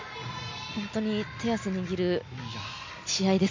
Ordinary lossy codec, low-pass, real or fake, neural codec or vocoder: MP3, 64 kbps; 7.2 kHz; real; none